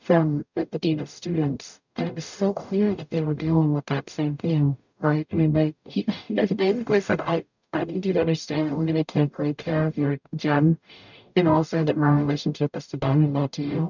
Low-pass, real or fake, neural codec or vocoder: 7.2 kHz; fake; codec, 44.1 kHz, 0.9 kbps, DAC